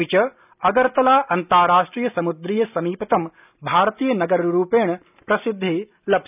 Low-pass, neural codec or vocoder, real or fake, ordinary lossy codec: 3.6 kHz; none; real; none